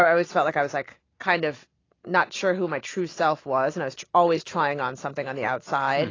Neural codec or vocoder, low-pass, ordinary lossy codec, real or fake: none; 7.2 kHz; AAC, 32 kbps; real